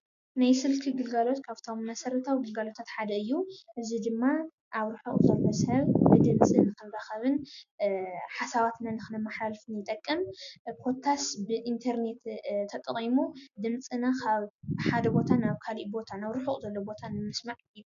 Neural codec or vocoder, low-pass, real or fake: none; 7.2 kHz; real